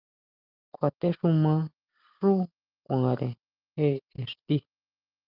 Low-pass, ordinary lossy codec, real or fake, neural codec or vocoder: 5.4 kHz; Opus, 32 kbps; real; none